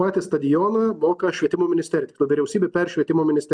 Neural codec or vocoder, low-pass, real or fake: none; 9.9 kHz; real